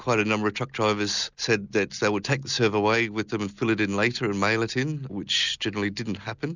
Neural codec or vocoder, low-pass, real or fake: none; 7.2 kHz; real